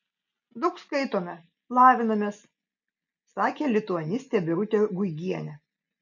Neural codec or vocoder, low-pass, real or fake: none; 7.2 kHz; real